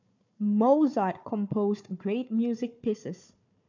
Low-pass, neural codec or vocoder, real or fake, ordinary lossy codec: 7.2 kHz; codec, 16 kHz, 4 kbps, FunCodec, trained on Chinese and English, 50 frames a second; fake; none